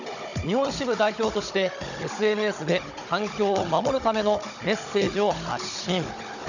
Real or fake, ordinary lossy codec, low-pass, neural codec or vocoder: fake; none; 7.2 kHz; codec, 16 kHz, 16 kbps, FunCodec, trained on Chinese and English, 50 frames a second